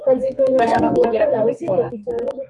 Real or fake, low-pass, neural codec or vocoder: fake; 10.8 kHz; codec, 32 kHz, 1.9 kbps, SNAC